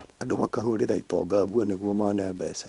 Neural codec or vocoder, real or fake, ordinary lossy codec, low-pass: codec, 24 kHz, 0.9 kbps, WavTokenizer, small release; fake; Opus, 64 kbps; 10.8 kHz